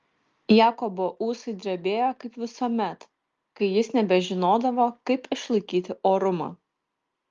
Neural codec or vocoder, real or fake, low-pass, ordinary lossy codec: none; real; 7.2 kHz; Opus, 32 kbps